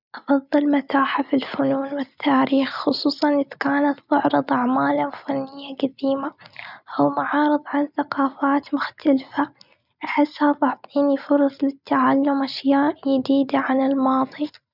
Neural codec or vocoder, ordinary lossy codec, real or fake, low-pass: none; none; real; 5.4 kHz